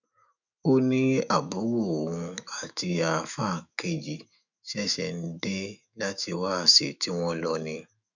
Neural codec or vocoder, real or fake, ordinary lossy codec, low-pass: autoencoder, 48 kHz, 128 numbers a frame, DAC-VAE, trained on Japanese speech; fake; none; 7.2 kHz